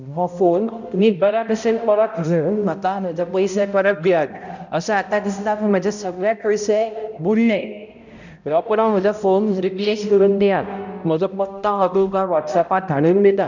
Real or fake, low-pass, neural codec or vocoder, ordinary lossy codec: fake; 7.2 kHz; codec, 16 kHz, 0.5 kbps, X-Codec, HuBERT features, trained on balanced general audio; none